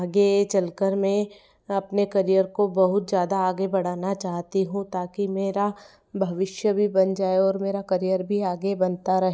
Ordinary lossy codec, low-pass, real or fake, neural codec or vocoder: none; none; real; none